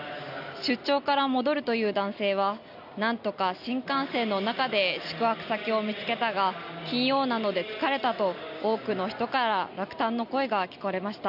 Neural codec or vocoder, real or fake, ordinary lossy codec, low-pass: none; real; none; 5.4 kHz